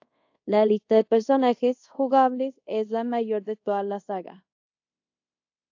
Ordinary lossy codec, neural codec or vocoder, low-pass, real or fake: AAC, 48 kbps; codec, 24 kHz, 0.5 kbps, DualCodec; 7.2 kHz; fake